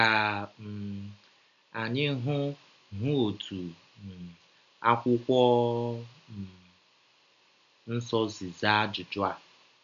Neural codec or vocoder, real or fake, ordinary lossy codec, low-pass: none; real; none; 7.2 kHz